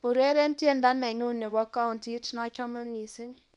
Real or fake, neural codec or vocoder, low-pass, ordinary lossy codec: fake; codec, 24 kHz, 0.9 kbps, WavTokenizer, small release; 10.8 kHz; none